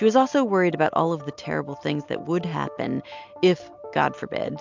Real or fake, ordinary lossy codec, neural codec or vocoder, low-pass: real; MP3, 64 kbps; none; 7.2 kHz